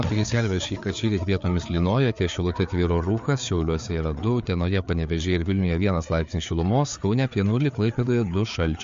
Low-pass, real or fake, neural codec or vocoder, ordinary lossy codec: 7.2 kHz; fake; codec, 16 kHz, 4 kbps, FreqCodec, larger model; MP3, 48 kbps